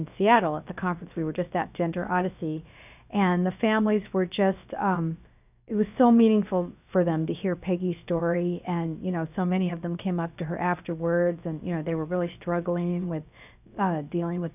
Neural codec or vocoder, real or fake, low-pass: codec, 16 kHz, about 1 kbps, DyCAST, with the encoder's durations; fake; 3.6 kHz